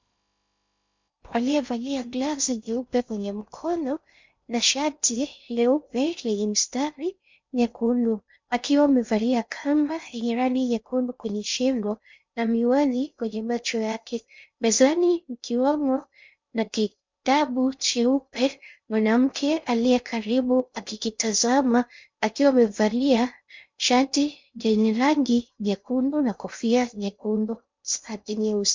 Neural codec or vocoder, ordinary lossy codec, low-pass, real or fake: codec, 16 kHz in and 24 kHz out, 0.6 kbps, FocalCodec, streaming, 2048 codes; MP3, 64 kbps; 7.2 kHz; fake